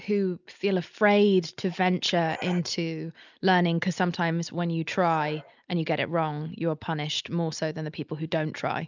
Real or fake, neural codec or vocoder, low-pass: real; none; 7.2 kHz